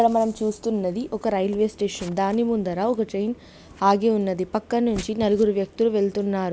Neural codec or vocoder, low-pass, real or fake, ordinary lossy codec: none; none; real; none